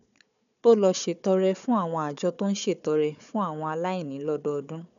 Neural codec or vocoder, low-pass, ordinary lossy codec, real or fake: codec, 16 kHz, 4 kbps, FunCodec, trained on Chinese and English, 50 frames a second; 7.2 kHz; none; fake